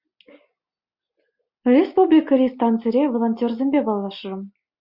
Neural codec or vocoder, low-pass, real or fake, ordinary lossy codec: none; 5.4 kHz; real; Opus, 64 kbps